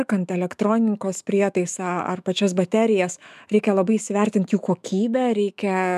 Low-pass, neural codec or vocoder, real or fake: 14.4 kHz; autoencoder, 48 kHz, 128 numbers a frame, DAC-VAE, trained on Japanese speech; fake